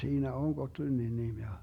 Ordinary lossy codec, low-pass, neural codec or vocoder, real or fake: MP3, 96 kbps; 19.8 kHz; none; real